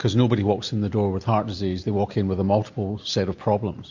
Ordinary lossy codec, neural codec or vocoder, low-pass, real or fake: MP3, 48 kbps; none; 7.2 kHz; real